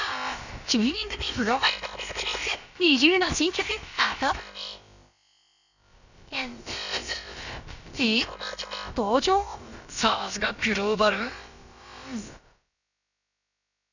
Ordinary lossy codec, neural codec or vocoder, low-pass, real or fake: none; codec, 16 kHz, about 1 kbps, DyCAST, with the encoder's durations; 7.2 kHz; fake